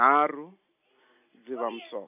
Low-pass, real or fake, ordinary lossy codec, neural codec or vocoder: 3.6 kHz; real; AAC, 32 kbps; none